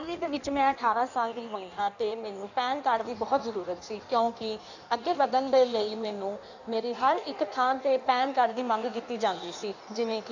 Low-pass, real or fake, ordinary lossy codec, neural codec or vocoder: 7.2 kHz; fake; none; codec, 16 kHz in and 24 kHz out, 1.1 kbps, FireRedTTS-2 codec